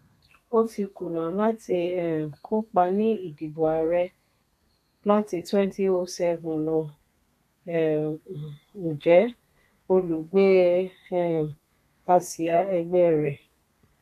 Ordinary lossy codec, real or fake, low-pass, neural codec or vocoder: MP3, 96 kbps; fake; 14.4 kHz; codec, 32 kHz, 1.9 kbps, SNAC